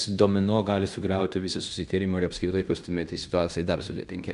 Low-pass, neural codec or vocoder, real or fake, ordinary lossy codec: 10.8 kHz; codec, 16 kHz in and 24 kHz out, 0.9 kbps, LongCat-Audio-Codec, fine tuned four codebook decoder; fake; MP3, 96 kbps